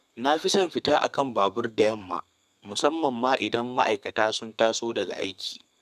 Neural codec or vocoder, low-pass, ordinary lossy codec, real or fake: codec, 32 kHz, 1.9 kbps, SNAC; 14.4 kHz; none; fake